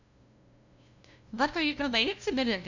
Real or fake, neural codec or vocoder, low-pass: fake; codec, 16 kHz, 0.5 kbps, FunCodec, trained on LibriTTS, 25 frames a second; 7.2 kHz